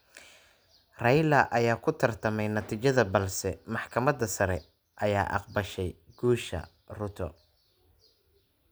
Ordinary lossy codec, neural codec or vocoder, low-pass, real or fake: none; none; none; real